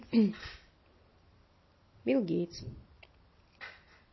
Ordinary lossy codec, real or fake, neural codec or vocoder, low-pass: MP3, 24 kbps; real; none; 7.2 kHz